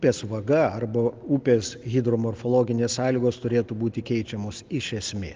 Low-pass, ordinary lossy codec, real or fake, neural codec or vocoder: 7.2 kHz; Opus, 32 kbps; real; none